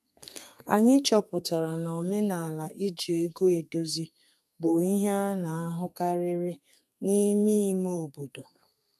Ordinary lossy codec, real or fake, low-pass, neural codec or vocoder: none; fake; 14.4 kHz; codec, 32 kHz, 1.9 kbps, SNAC